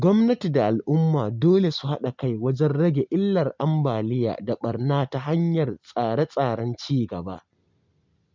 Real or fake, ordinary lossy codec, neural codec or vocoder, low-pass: real; MP3, 64 kbps; none; 7.2 kHz